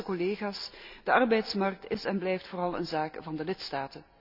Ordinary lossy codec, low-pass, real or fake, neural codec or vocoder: none; 5.4 kHz; real; none